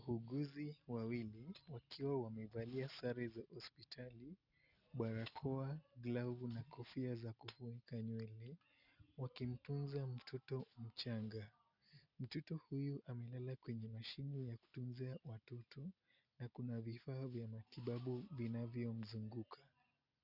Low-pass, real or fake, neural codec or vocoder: 5.4 kHz; real; none